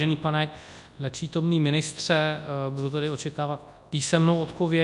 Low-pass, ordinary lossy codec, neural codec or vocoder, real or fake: 10.8 kHz; MP3, 96 kbps; codec, 24 kHz, 0.9 kbps, WavTokenizer, large speech release; fake